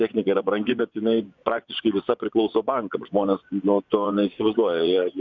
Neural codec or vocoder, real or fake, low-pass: vocoder, 24 kHz, 100 mel bands, Vocos; fake; 7.2 kHz